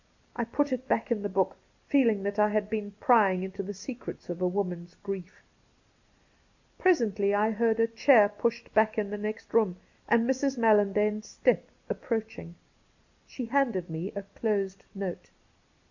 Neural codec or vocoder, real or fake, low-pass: none; real; 7.2 kHz